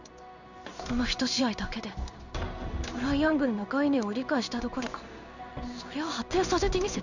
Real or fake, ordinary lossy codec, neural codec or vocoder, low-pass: fake; none; codec, 16 kHz in and 24 kHz out, 1 kbps, XY-Tokenizer; 7.2 kHz